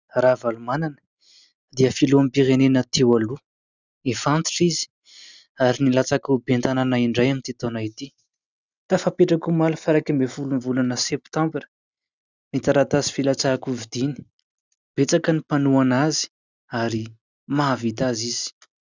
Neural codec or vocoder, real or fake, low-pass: none; real; 7.2 kHz